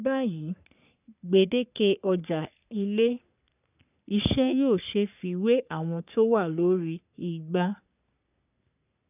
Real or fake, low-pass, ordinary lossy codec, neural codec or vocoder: fake; 3.6 kHz; none; codec, 44.1 kHz, 3.4 kbps, Pupu-Codec